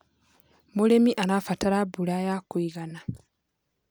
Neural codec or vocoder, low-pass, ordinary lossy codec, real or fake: none; none; none; real